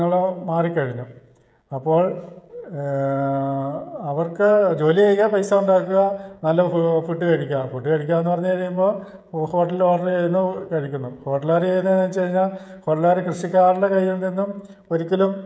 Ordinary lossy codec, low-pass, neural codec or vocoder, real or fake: none; none; codec, 16 kHz, 16 kbps, FreqCodec, smaller model; fake